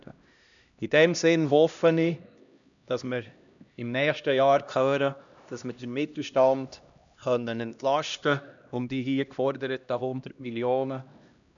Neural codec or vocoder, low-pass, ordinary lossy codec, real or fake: codec, 16 kHz, 1 kbps, X-Codec, HuBERT features, trained on LibriSpeech; 7.2 kHz; none; fake